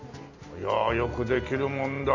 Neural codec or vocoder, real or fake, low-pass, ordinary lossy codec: none; real; 7.2 kHz; none